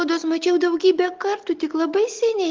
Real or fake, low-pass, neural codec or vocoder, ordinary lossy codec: real; 7.2 kHz; none; Opus, 16 kbps